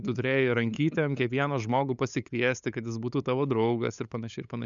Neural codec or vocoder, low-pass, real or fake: codec, 16 kHz, 8 kbps, FunCodec, trained on LibriTTS, 25 frames a second; 7.2 kHz; fake